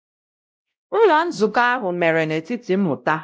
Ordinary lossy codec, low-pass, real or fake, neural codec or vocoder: none; none; fake; codec, 16 kHz, 0.5 kbps, X-Codec, WavLM features, trained on Multilingual LibriSpeech